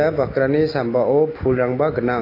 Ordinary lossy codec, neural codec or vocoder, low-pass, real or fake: AAC, 24 kbps; none; 5.4 kHz; real